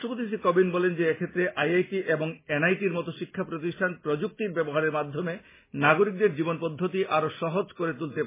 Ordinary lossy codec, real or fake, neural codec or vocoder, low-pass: MP3, 16 kbps; real; none; 3.6 kHz